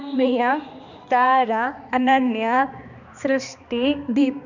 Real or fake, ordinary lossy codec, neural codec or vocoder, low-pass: fake; none; codec, 16 kHz, 2 kbps, X-Codec, HuBERT features, trained on balanced general audio; 7.2 kHz